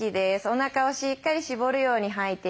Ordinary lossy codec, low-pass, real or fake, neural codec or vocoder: none; none; real; none